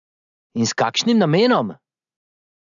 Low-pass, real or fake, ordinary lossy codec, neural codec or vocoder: 7.2 kHz; real; none; none